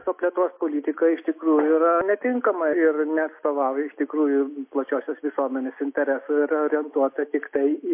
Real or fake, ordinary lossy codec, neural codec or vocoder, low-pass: real; MP3, 24 kbps; none; 3.6 kHz